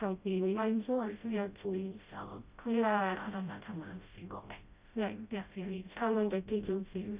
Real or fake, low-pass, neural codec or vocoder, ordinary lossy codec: fake; 3.6 kHz; codec, 16 kHz, 0.5 kbps, FreqCodec, smaller model; Opus, 64 kbps